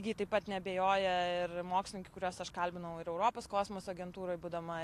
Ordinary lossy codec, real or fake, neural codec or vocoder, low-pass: AAC, 64 kbps; real; none; 14.4 kHz